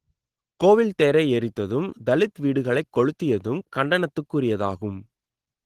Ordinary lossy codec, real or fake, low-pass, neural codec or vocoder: Opus, 16 kbps; real; 14.4 kHz; none